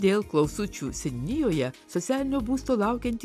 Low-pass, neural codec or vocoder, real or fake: 14.4 kHz; none; real